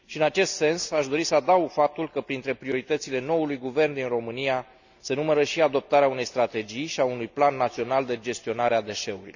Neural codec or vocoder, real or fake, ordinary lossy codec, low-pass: none; real; none; 7.2 kHz